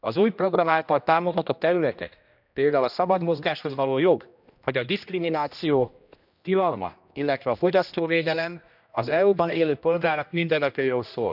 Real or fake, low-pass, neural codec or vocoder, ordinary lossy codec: fake; 5.4 kHz; codec, 16 kHz, 1 kbps, X-Codec, HuBERT features, trained on general audio; none